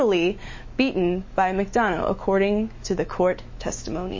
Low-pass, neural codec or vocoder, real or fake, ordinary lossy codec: 7.2 kHz; autoencoder, 48 kHz, 128 numbers a frame, DAC-VAE, trained on Japanese speech; fake; MP3, 32 kbps